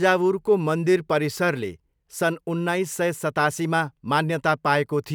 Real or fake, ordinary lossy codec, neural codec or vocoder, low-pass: real; none; none; none